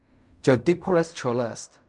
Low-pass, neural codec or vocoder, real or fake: 10.8 kHz; codec, 16 kHz in and 24 kHz out, 0.4 kbps, LongCat-Audio-Codec, fine tuned four codebook decoder; fake